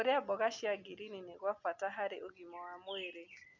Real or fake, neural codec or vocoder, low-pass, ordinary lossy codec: real; none; 7.2 kHz; none